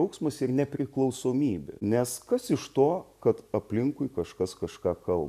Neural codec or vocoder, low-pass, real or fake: none; 14.4 kHz; real